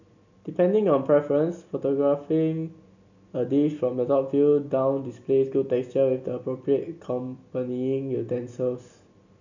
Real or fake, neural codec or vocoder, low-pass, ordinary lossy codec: real; none; 7.2 kHz; none